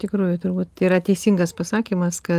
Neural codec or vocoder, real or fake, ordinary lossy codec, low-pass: none; real; Opus, 24 kbps; 14.4 kHz